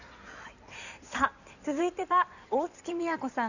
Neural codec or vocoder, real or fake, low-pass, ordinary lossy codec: codec, 16 kHz in and 24 kHz out, 2.2 kbps, FireRedTTS-2 codec; fake; 7.2 kHz; none